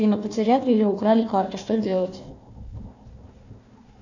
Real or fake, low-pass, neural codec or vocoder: fake; 7.2 kHz; codec, 16 kHz, 1 kbps, FunCodec, trained on Chinese and English, 50 frames a second